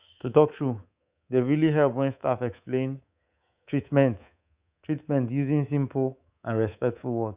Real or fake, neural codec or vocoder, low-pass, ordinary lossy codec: fake; codec, 24 kHz, 3.1 kbps, DualCodec; 3.6 kHz; Opus, 64 kbps